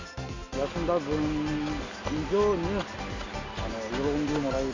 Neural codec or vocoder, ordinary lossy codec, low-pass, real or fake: none; AAC, 48 kbps; 7.2 kHz; real